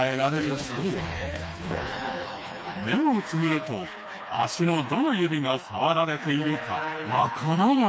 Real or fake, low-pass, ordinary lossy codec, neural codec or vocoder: fake; none; none; codec, 16 kHz, 2 kbps, FreqCodec, smaller model